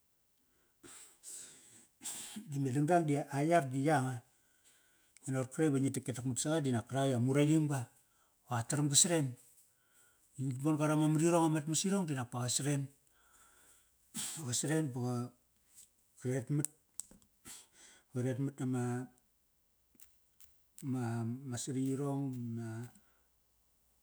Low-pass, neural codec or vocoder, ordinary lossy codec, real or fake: none; autoencoder, 48 kHz, 128 numbers a frame, DAC-VAE, trained on Japanese speech; none; fake